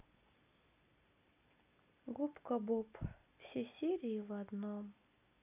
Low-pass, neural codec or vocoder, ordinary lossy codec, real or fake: 3.6 kHz; none; none; real